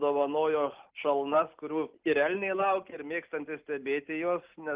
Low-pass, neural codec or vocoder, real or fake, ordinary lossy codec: 3.6 kHz; none; real; Opus, 32 kbps